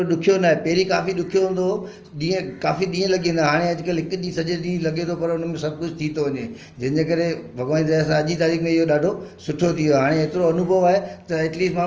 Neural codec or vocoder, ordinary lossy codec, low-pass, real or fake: none; Opus, 24 kbps; 7.2 kHz; real